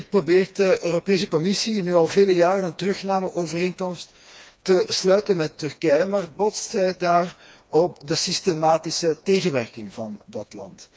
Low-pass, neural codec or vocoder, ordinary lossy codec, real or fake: none; codec, 16 kHz, 2 kbps, FreqCodec, smaller model; none; fake